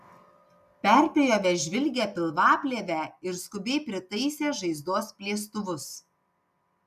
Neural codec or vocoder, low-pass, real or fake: none; 14.4 kHz; real